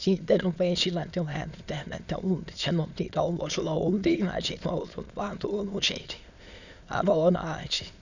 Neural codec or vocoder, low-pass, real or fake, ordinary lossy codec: autoencoder, 22.05 kHz, a latent of 192 numbers a frame, VITS, trained on many speakers; 7.2 kHz; fake; none